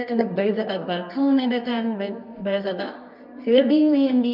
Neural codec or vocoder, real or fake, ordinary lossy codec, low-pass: codec, 24 kHz, 0.9 kbps, WavTokenizer, medium music audio release; fake; none; 5.4 kHz